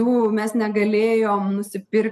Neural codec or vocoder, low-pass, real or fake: none; 14.4 kHz; real